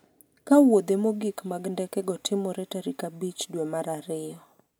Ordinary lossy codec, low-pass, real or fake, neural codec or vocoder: none; none; real; none